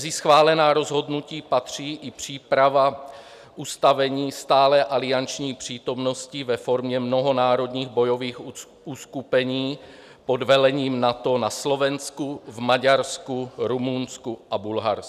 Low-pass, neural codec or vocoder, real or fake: 14.4 kHz; none; real